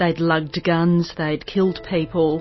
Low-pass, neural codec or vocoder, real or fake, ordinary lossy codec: 7.2 kHz; none; real; MP3, 24 kbps